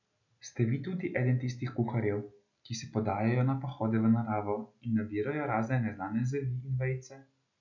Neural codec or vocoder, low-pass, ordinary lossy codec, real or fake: none; 7.2 kHz; none; real